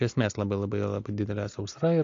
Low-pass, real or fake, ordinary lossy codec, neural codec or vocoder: 7.2 kHz; real; AAC, 48 kbps; none